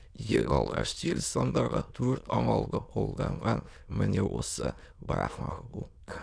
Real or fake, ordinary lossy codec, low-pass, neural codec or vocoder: fake; none; 9.9 kHz; autoencoder, 22.05 kHz, a latent of 192 numbers a frame, VITS, trained on many speakers